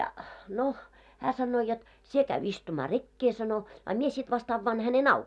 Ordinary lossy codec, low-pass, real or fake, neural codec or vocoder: none; none; real; none